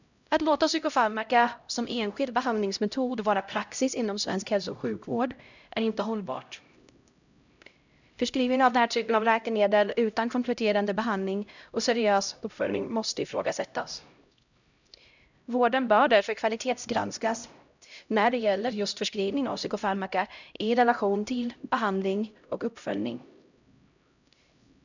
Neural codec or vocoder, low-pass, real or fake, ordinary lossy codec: codec, 16 kHz, 0.5 kbps, X-Codec, HuBERT features, trained on LibriSpeech; 7.2 kHz; fake; none